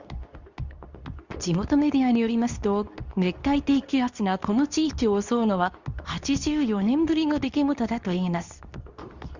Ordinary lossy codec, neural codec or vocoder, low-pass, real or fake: Opus, 64 kbps; codec, 24 kHz, 0.9 kbps, WavTokenizer, medium speech release version 2; 7.2 kHz; fake